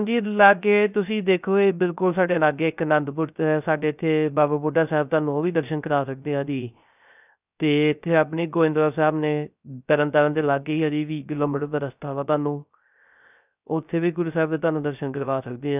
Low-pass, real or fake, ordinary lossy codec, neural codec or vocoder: 3.6 kHz; fake; none; codec, 16 kHz, 0.3 kbps, FocalCodec